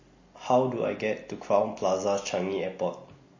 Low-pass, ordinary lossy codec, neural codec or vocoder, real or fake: 7.2 kHz; MP3, 32 kbps; none; real